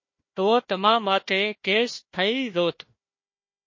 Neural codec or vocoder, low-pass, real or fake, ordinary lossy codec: codec, 16 kHz, 1 kbps, FunCodec, trained on Chinese and English, 50 frames a second; 7.2 kHz; fake; MP3, 32 kbps